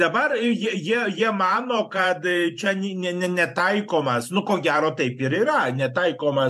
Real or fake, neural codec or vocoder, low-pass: fake; vocoder, 44.1 kHz, 128 mel bands every 512 samples, BigVGAN v2; 14.4 kHz